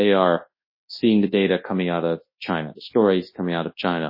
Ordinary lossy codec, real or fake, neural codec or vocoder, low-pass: MP3, 24 kbps; fake; codec, 24 kHz, 0.9 kbps, WavTokenizer, large speech release; 5.4 kHz